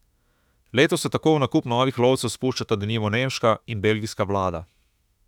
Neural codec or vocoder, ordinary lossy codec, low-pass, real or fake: autoencoder, 48 kHz, 32 numbers a frame, DAC-VAE, trained on Japanese speech; none; 19.8 kHz; fake